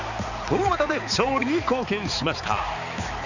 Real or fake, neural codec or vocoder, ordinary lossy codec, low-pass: fake; codec, 16 kHz, 4 kbps, X-Codec, HuBERT features, trained on balanced general audio; none; 7.2 kHz